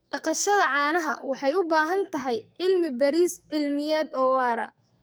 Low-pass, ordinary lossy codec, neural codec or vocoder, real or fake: none; none; codec, 44.1 kHz, 2.6 kbps, SNAC; fake